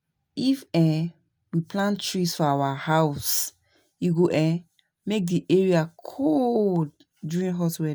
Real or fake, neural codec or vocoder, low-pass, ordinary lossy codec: real; none; none; none